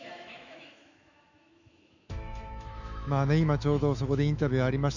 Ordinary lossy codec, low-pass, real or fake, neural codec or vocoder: none; 7.2 kHz; fake; autoencoder, 48 kHz, 128 numbers a frame, DAC-VAE, trained on Japanese speech